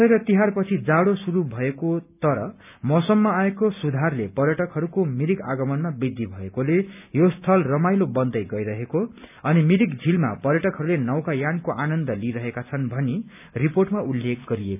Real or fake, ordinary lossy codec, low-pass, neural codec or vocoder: real; none; 3.6 kHz; none